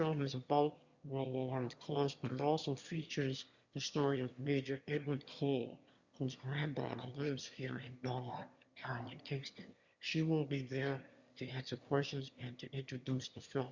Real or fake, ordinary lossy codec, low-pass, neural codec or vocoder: fake; Opus, 64 kbps; 7.2 kHz; autoencoder, 22.05 kHz, a latent of 192 numbers a frame, VITS, trained on one speaker